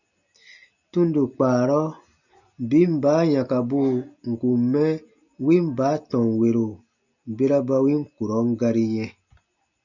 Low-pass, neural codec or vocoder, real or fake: 7.2 kHz; none; real